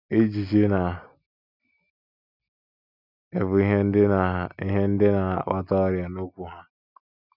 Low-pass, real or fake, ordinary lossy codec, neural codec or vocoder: 5.4 kHz; real; none; none